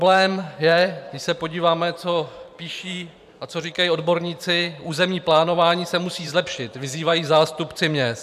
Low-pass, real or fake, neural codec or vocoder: 14.4 kHz; fake; vocoder, 44.1 kHz, 128 mel bands every 512 samples, BigVGAN v2